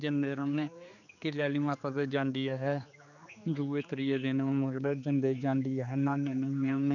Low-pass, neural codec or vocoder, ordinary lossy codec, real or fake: 7.2 kHz; codec, 16 kHz, 4 kbps, X-Codec, HuBERT features, trained on general audio; none; fake